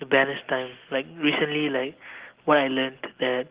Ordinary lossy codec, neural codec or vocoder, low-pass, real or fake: Opus, 16 kbps; none; 3.6 kHz; real